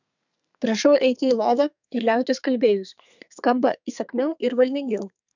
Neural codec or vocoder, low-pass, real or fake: codec, 32 kHz, 1.9 kbps, SNAC; 7.2 kHz; fake